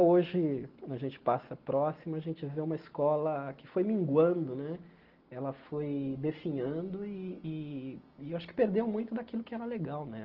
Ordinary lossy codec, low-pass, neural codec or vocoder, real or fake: Opus, 16 kbps; 5.4 kHz; none; real